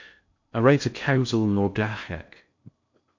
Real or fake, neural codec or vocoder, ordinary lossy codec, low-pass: fake; codec, 16 kHz in and 24 kHz out, 0.6 kbps, FocalCodec, streaming, 2048 codes; MP3, 48 kbps; 7.2 kHz